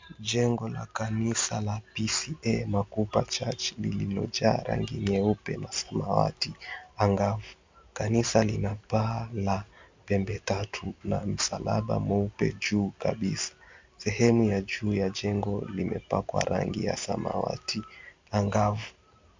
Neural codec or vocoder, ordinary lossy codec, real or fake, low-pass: none; MP3, 64 kbps; real; 7.2 kHz